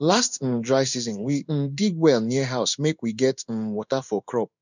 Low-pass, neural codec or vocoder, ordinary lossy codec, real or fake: 7.2 kHz; codec, 16 kHz in and 24 kHz out, 1 kbps, XY-Tokenizer; none; fake